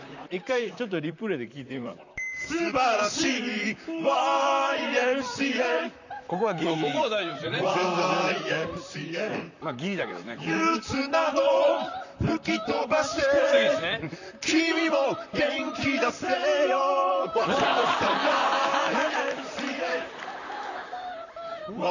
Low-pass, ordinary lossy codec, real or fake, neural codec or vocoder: 7.2 kHz; none; fake; vocoder, 44.1 kHz, 128 mel bands, Pupu-Vocoder